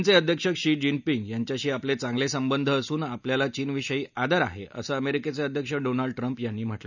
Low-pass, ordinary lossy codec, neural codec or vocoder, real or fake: 7.2 kHz; none; none; real